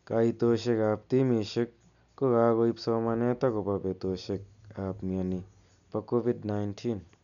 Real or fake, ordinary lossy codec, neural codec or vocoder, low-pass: real; none; none; 7.2 kHz